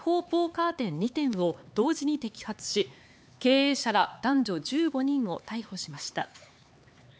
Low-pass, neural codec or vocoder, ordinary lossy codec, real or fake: none; codec, 16 kHz, 4 kbps, X-Codec, HuBERT features, trained on LibriSpeech; none; fake